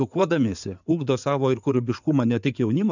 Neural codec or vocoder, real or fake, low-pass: codec, 16 kHz in and 24 kHz out, 2.2 kbps, FireRedTTS-2 codec; fake; 7.2 kHz